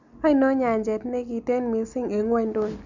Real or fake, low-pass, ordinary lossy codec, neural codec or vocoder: real; 7.2 kHz; none; none